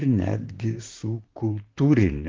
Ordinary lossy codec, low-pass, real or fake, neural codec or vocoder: Opus, 16 kbps; 7.2 kHz; fake; vocoder, 44.1 kHz, 128 mel bands, Pupu-Vocoder